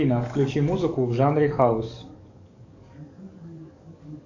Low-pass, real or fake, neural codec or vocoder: 7.2 kHz; fake; codec, 44.1 kHz, 7.8 kbps, DAC